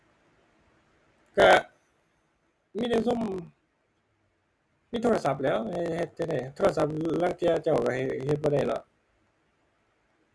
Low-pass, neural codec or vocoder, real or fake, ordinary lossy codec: none; none; real; none